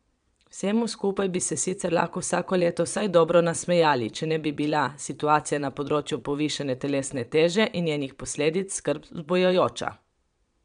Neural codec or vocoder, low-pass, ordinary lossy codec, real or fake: vocoder, 22.05 kHz, 80 mel bands, Vocos; 9.9 kHz; MP3, 96 kbps; fake